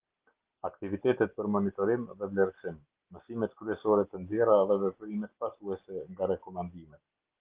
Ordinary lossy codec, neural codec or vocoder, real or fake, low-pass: Opus, 16 kbps; none; real; 3.6 kHz